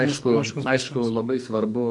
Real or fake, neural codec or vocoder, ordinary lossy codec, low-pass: fake; codec, 44.1 kHz, 2.6 kbps, SNAC; MP3, 64 kbps; 10.8 kHz